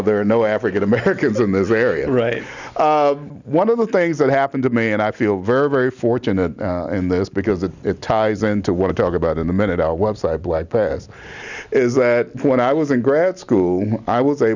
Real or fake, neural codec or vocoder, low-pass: real; none; 7.2 kHz